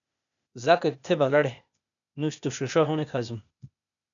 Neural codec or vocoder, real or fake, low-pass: codec, 16 kHz, 0.8 kbps, ZipCodec; fake; 7.2 kHz